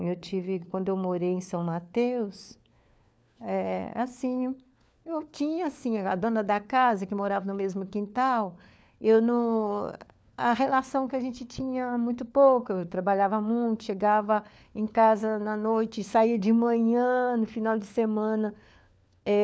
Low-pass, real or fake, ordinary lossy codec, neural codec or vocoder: none; fake; none; codec, 16 kHz, 4 kbps, FunCodec, trained on LibriTTS, 50 frames a second